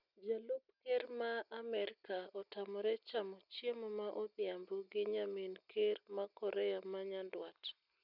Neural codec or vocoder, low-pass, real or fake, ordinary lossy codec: none; 5.4 kHz; real; none